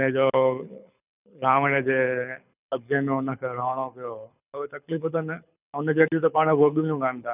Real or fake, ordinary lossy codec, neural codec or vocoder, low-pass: fake; none; codec, 24 kHz, 6 kbps, HILCodec; 3.6 kHz